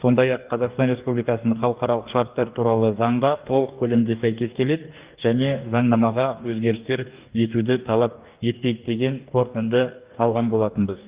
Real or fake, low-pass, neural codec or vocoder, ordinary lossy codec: fake; 3.6 kHz; codec, 44.1 kHz, 2.6 kbps, DAC; Opus, 32 kbps